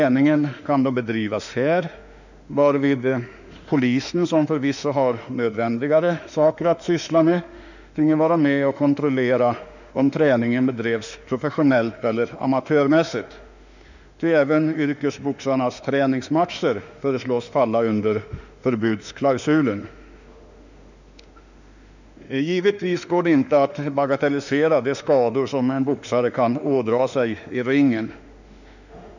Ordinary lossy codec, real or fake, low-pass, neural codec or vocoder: none; fake; 7.2 kHz; autoencoder, 48 kHz, 32 numbers a frame, DAC-VAE, trained on Japanese speech